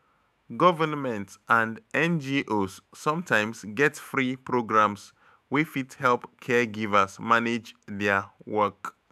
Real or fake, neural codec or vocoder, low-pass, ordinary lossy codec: fake; autoencoder, 48 kHz, 128 numbers a frame, DAC-VAE, trained on Japanese speech; 14.4 kHz; none